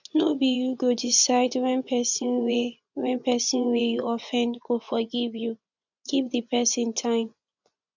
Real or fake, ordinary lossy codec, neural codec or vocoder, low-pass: fake; none; vocoder, 24 kHz, 100 mel bands, Vocos; 7.2 kHz